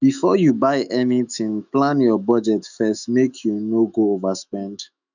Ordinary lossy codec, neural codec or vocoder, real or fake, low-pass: none; autoencoder, 48 kHz, 128 numbers a frame, DAC-VAE, trained on Japanese speech; fake; 7.2 kHz